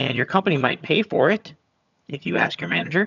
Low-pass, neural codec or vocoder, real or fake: 7.2 kHz; vocoder, 22.05 kHz, 80 mel bands, HiFi-GAN; fake